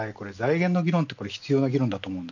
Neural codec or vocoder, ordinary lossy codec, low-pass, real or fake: none; none; 7.2 kHz; real